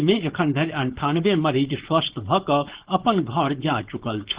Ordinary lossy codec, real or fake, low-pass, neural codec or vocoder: Opus, 16 kbps; fake; 3.6 kHz; codec, 16 kHz, 4.8 kbps, FACodec